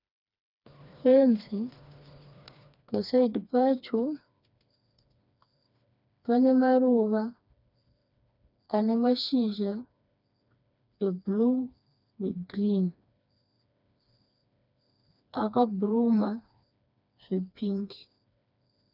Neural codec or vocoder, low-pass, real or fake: codec, 16 kHz, 2 kbps, FreqCodec, smaller model; 5.4 kHz; fake